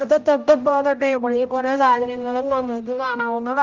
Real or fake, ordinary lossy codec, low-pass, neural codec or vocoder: fake; Opus, 24 kbps; 7.2 kHz; codec, 16 kHz, 0.5 kbps, X-Codec, HuBERT features, trained on general audio